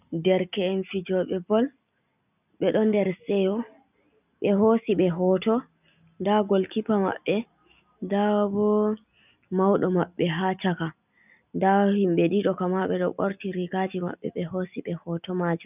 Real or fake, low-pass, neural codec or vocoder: real; 3.6 kHz; none